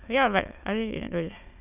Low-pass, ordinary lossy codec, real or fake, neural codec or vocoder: 3.6 kHz; none; fake; autoencoder, 22.05 kHz, a latent of 192 numbers a frame, VITS, trained on many speakers